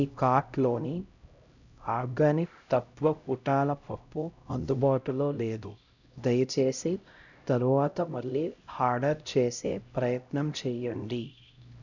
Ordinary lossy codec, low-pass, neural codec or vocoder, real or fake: none; 7.2 kHz; codec, 16 kHz, 0.5 kbps, X-Codec, HuBERT features, trained on LibriSpeech; fake